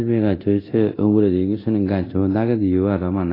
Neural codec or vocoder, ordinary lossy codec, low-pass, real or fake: codec, 24 kHz, 0.9 kbps, DualCodec; AAC, 24 kbps; 5.4 kHz; fake